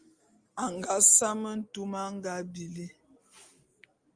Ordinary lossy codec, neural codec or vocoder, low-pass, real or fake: Opus, 32 kbps; none; 9.9 kHz; real